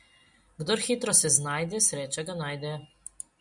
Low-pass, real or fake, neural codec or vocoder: 10.8 kHz; real; none